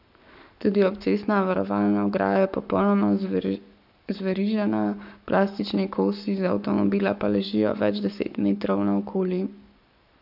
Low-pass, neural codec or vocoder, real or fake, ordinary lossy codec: 5.4 kHz; codec, 44.1 kHz, 7.8 kbps, Pupu-Codec; fake; none